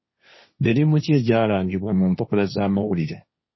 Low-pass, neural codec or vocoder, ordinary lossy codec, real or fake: 7.2 kHz; codec, 16 kHz, 1.1 kbps, Voila-Tokenizer; MP3, 24 kbps; fake